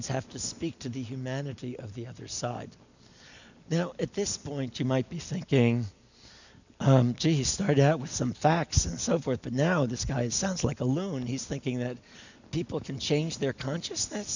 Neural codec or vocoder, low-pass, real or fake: none; 7.2 kHz; real